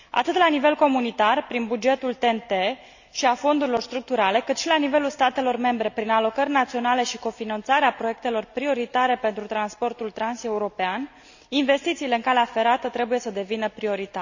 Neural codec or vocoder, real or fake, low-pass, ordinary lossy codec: none; real; 7.2 kHz; none